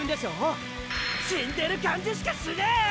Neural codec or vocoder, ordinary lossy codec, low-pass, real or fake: none; none; none; real